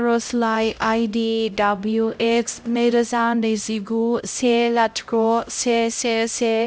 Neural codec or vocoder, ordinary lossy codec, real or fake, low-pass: codec, 16 kHz, 0.5 kbps, X-Codec, HuBERT features, trained on LibriSpeech; none; fake; none